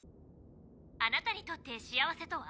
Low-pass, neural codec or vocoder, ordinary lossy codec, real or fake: none; none; none; real